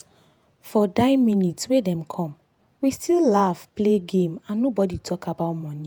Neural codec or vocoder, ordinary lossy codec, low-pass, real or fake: vocoder, 48 kHz, 128 mel bands, Vocos; none; none; fake